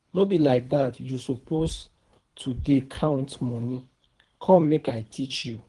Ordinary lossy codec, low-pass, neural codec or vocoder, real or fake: Opus, 24 kbps; 10.8 kHz; codec, 24 kHz, 3 kbps, HILCodec; fake